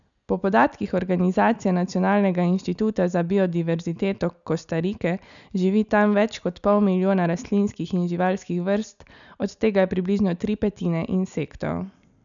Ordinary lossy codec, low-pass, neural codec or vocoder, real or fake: none; 7.2 kHz; none; real